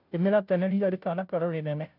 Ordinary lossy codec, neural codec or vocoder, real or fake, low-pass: none; codec, 16 kHz, 0.5 kbps, FunCodec, trained on Chinese and English, 25 frames a second; fake; 5.4 kHz